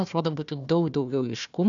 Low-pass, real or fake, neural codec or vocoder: 7.2 kHz; fake; codec, 16 kHz, 1 kbps, FunCodec, trained on Chinese and English, 50 frames a second